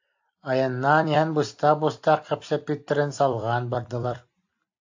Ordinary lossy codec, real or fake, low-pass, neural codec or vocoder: AAC, 48 kbps; fake; 7.2 kHz; vocoder, 44.1 kHz, 128 mel bands every 256 samples, BigVGAN v2